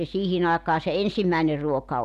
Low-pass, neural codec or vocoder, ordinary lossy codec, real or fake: 14.4 kHz; none; none; real